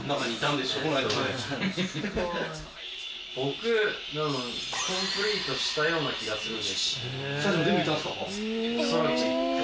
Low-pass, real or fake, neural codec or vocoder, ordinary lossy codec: none; real; none; none